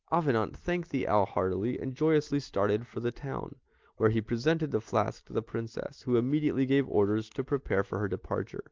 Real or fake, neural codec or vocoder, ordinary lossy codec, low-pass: real; none; Opus, 24 kbps; 7.2 kHz